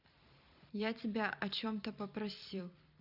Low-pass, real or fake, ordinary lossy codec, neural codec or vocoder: 5.4 kHz; fake; none; vocoder, 44.1 kHz, 128 mel bands every 256 samples, BigVGAN v2